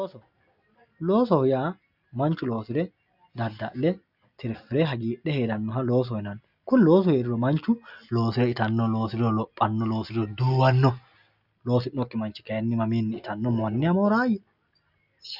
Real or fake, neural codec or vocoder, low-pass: real; none; 5.4 kHz